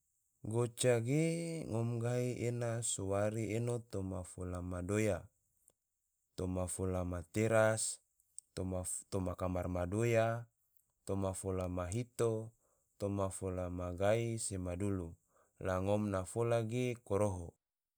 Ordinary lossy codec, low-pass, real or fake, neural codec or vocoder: none; none; real; none